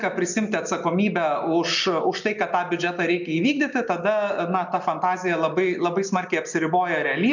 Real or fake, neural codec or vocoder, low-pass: real; none; 7.2 kHz